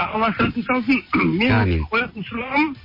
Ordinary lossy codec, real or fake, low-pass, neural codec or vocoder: MP3, 24 kbps; real; 5.4 kHz; none